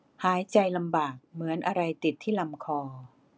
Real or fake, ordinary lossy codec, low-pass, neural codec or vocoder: real; none; none; none